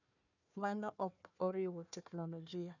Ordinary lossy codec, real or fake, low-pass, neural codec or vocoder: none; fake; 7.2 kHz; codec, 16 kHz, 1 kbps, FunCodec, trained on Chinese and English, 50 frames a second